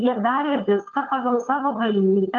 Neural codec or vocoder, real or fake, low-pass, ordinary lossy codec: codec, 16 kHz, 16 kbps, FunCodec, trained on LibriTTS, 50 frames a second; fake; 7.2 kHz; Opus, 32 kbps